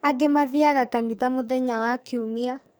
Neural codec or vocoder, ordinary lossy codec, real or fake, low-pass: codec, 44.1 kHz, 2.6 kbps, SNAC; none; fake; none